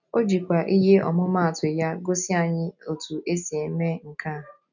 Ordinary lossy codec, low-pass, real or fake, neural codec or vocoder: none; 7.2 kHz; real; none